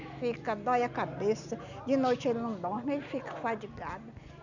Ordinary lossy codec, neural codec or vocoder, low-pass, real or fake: none; none; 7.2 kHz; real